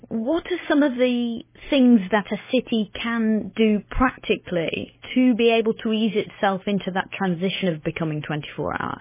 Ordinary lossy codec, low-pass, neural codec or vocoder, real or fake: MP3, 16 kbps; 3.6 kHz; none; real